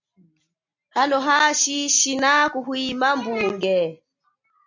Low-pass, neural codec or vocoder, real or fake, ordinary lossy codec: 7.2 kHz; none; real; MP3, 64 kbps